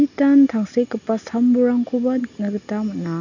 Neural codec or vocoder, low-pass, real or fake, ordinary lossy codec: none; 7.2 kHz; real; none